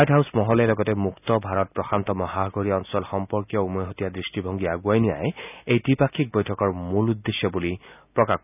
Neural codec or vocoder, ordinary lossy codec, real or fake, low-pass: none; none; real; 3.6 kHz